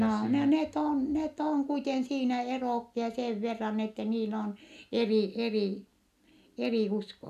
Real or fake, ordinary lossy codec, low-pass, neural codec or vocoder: real; none; 14.4 kHz; none